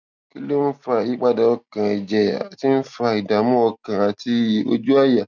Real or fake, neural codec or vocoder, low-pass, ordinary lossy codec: real; none; 7.2 kHz; none